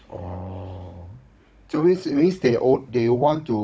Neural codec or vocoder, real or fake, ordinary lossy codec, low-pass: codec, 16 kHz, 16 kbps, FunCodec, trained on Chinese and English, 50 frames a second; fake; none; none